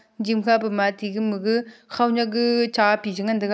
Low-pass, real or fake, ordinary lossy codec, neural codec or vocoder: none; real; none; none